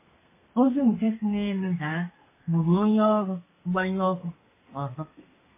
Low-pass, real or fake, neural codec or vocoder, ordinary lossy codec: 3.6 kHz; fake; codec, 32 kHz, 1.9 kbps, SNAC; MP3, 16 kbps